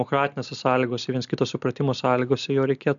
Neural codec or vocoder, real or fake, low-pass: none; real; 7.2 kHz